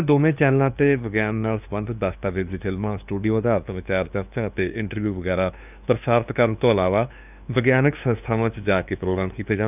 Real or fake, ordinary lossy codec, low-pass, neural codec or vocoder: fake; none; 3.6 kHz; codec, 16 kHz, 2 kbps, FunCodec, trained on LibriTTS, 25 frames a second